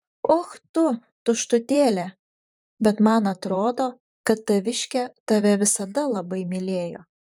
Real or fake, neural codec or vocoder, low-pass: fake; vocoder, 44.1 kHz, 128 mel bands, Pupu-Vocoder; 19.8 kHz